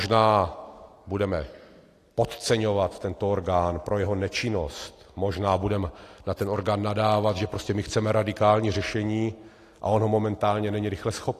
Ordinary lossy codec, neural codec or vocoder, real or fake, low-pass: AAC, 48 kbps; none; real; 14.4 kHz